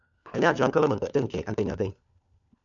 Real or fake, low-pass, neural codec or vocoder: fake; 7.2 kHz; codec, 16 kHz, 4 kbps, FunCodec, trained on LibriTTS, 50 frames a second